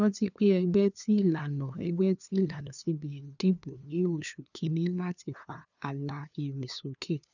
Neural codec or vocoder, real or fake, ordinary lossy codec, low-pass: codec, 16 kHz, 2 kbps, FunCodec, trained on LibriTTS, 25 frames a second; fake; none; 7.2 kHz